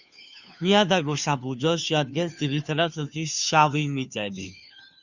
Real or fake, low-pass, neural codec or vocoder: fake; 7.2 kHz; codec, 16 kHz, 2 kbps, FunCodec, trained on Chinese and English, 25 frames a second